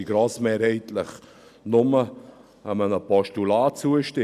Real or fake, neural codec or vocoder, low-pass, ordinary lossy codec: real; none; 14.4 kHz; none